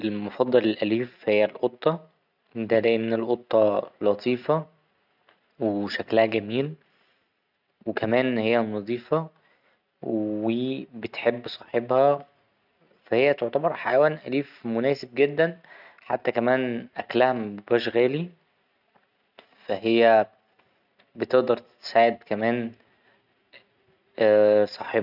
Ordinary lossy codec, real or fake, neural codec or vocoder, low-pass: none; real; none; 5.4 kHz